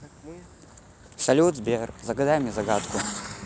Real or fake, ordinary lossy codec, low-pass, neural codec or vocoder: real; none; none; none